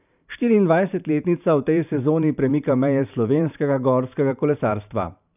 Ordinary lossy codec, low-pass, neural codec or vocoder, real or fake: none; 3.6 kHz; vocoder, 44.1 kHz, 128 mel bands every 512 samples, BigVGAN v2; fake